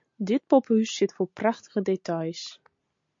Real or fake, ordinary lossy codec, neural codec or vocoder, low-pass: real; MP3, 48 kbps; none; 7.2 kHz